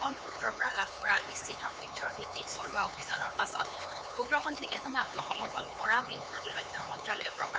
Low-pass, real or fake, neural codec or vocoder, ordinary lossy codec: none; fake; codec, 16 kHz, 4 kbps, X-Codec, HuBERT features, trained on LibriSpeech; none